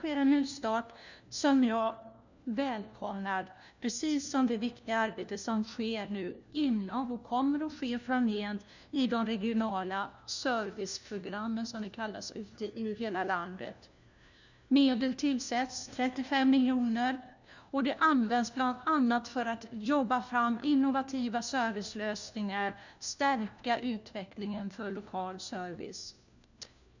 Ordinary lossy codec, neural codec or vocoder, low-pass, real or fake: none; codec, 16 kHz, 1 kbps, FunCodec, trained on LibriTTS, 50 frames a second; 7.2 kHz; fake